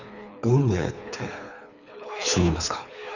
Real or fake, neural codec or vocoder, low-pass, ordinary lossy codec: fake; codec, 24 kHz, 3 kbps, HILCodec; 7.2 kHz; none